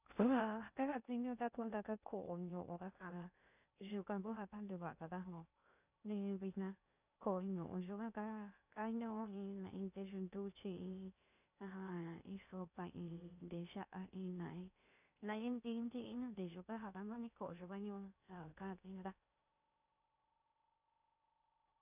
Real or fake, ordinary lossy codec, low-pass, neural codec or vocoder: fake; none; 3.6 kHz; codec, 16 kHz in and 24 kHz out, 0.6 kbps, FocalCodec, streaming, 2048 codes